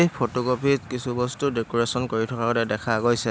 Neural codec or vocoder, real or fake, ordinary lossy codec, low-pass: none; real; none; none